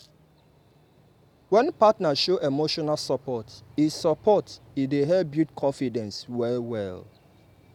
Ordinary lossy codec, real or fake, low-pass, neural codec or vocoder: none; real; 19.8 kHz; none